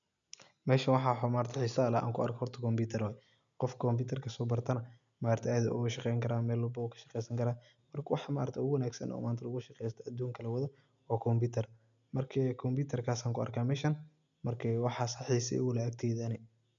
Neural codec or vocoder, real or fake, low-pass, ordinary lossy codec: none; real; 7.2 kHz; none